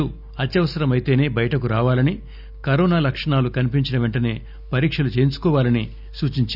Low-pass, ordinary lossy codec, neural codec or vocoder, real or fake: 5.4 kHz; none; none; real